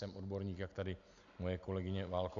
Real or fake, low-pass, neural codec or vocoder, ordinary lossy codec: real; 7.2 kHz; none; AAC, 64 kbps